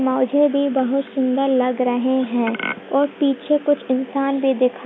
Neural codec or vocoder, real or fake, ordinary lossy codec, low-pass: none; real; none; none